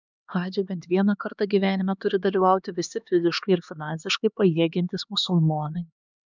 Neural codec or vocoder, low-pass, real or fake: codec, 16 kHz, 2 kbps, X-Codec, HuBERT features, trained on LibriSpeech; 7.2 kHz; fake